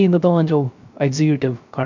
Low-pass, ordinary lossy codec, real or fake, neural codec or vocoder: 7.2 kHz; none; fake; codec, 16 kHz, 0.3 kbps, FocalCodec